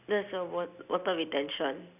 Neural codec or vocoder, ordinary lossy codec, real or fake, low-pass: none; none; real; 3.6 kHz